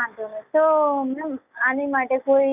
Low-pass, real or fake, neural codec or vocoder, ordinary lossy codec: 3.6 kHz; real; none; none